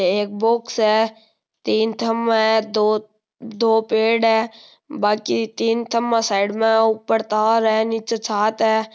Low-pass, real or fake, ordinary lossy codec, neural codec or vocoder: none; real; none; none